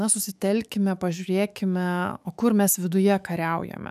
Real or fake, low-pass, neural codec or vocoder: fake; 14.4 kHz; autoencoder, 48 kHz, 128 numbers a frame, DAC-VAE, trained on Japanese speech